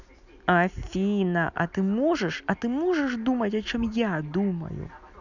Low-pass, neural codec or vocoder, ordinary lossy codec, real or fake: 7.2 kHz; none; none; real